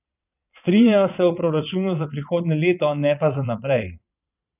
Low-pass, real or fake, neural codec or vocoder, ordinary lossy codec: 3.6 kHz; fake; vocoder, 22.05 kHz, 80 mel bands, Vocos; none